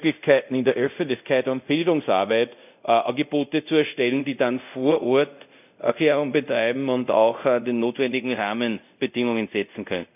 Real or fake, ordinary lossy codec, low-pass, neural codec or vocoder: fake; none; 3.6 kHz; codec, 24 kHz, 0.5 kbps, DualCodec